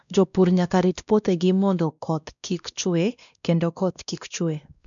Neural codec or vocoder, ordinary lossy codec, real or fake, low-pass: codec, 16 kHz, 1 kbps, X-Codec, WavLM features, trained on Multilingual LibriSpeech; none; fake; 7.2 kHz